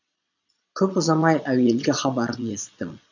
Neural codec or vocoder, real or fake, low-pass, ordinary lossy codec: vocoder, 22.05 kHz, 80 mel bands, Vocos; fake; 7.2 kHz; none